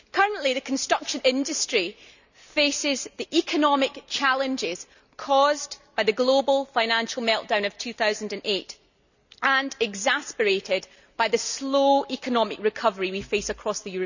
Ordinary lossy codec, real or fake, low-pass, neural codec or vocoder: none; real; 7.2 kHz; none